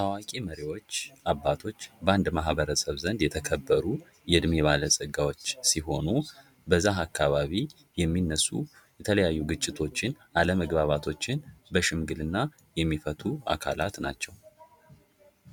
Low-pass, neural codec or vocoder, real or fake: 19.8 kHz; none; real